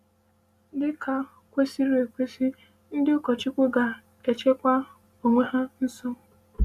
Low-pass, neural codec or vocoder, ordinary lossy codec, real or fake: 14.4 kHz; none; Opus, 64 kbps; real